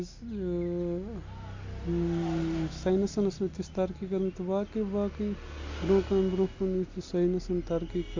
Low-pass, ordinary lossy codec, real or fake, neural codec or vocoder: 7.2 kHz; MP3, 48 kbps; real; none